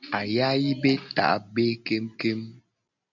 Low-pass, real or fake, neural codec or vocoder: 7.2 kHz; real; none